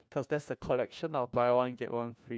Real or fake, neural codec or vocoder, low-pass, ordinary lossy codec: fake; codec, 16 kHz, 1 kbps, FunCodec, trained on LibriTTS, 50 frames a second; none; none